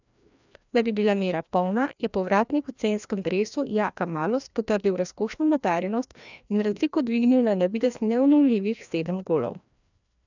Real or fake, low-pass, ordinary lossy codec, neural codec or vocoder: fake; 7.2 kHz; none; codec, 16 kHz, 1 kbps, FreqCodec, larger model